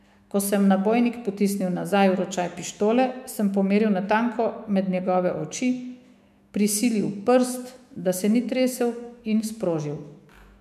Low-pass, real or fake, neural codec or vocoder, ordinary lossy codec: 14.4 kHz; fake; autoencoder, 48 kHz, 128 numbers a frame, DAC-VAE, trained on Japanese speech; MP3, 96 kbps